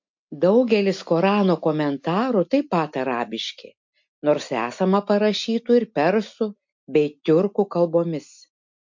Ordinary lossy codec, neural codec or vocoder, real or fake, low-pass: MP3, 48 kbps; none; real; 7.2 kHz